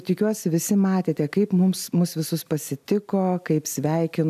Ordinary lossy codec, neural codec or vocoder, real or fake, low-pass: MP3, 96 kbps; none; real; 14.4 kHz